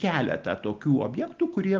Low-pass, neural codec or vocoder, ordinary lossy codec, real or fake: 7.2 kHz; none; Opus, 24 kbps; real